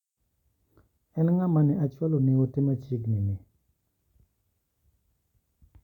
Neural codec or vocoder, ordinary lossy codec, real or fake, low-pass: none; none; real; 19.8 kHz